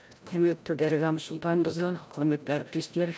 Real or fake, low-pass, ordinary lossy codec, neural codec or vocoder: fake; none; none; codec, 16 kHz, 0.5 kbps, FreqCodec, larger model